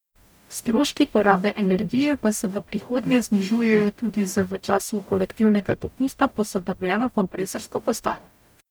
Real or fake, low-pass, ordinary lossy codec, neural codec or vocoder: fake; none; none; codec, 44.1 kHz, 0.9 kbps, DAC